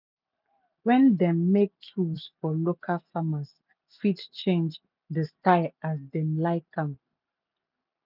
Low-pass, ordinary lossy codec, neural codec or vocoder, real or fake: 5.4 kHz; none; none; real